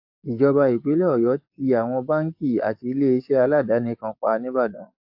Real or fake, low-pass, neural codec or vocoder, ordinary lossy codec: fake; 5.4 kHz; codec, 44.1 kHz, 7.8 kbps, DAC; AAC, 48 kbps